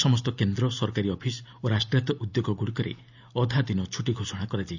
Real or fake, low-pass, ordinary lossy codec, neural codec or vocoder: real; 7.2 kHz; none; none